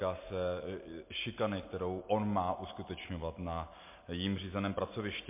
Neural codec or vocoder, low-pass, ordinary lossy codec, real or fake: none; 3.6 kHz; MP3, 24 kbps; real